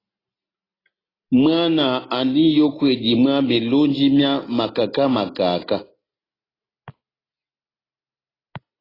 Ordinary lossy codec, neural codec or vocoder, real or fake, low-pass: AAC, 24 kbps; none; real; 5.4 kHz